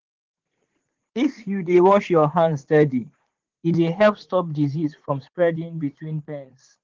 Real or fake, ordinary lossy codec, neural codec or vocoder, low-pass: fake; Opus, 16 kbps; vocoder, 44.1 kHz, 80 mel bands, Vocos; 7.2 kHz